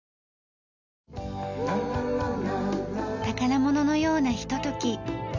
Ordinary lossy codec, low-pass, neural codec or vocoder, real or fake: none; 7.2 kHz; none; real